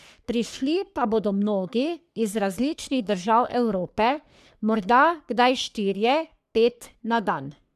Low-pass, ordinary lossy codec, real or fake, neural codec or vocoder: 14.4 kHz; none; fake; codec, 44.1 kHz, 3.4 kbps, Pupu-Codec